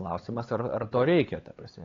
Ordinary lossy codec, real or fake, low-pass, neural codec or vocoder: AAC, 32 kbps; fake; 7.2 kHz; codec, 16 kHz, 4 kbps, X-Codec, HuBERT features, trained on LibriSpeech